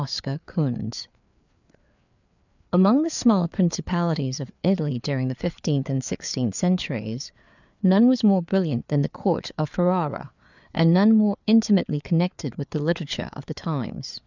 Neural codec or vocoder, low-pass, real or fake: codec, 16 kHz, 4 kbps, FreqCodec, larger model; 7.2 kHz; fake